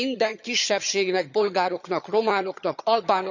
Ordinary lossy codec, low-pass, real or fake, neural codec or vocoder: none; 7.2 kHz; fake; vocoder, 22.05 kHz, 80 mel bands, HiFi-GAN